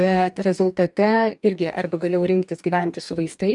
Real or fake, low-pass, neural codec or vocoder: fake; 10.8 kHz; codec, 44.1 kHz, 2.6 kbps, DAC